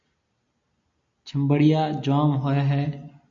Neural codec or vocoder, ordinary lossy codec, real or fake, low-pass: none; MP3, 48 kbps; real; 7.2 kHz